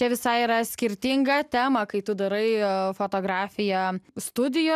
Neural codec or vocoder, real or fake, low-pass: none; real; 14.4 kHz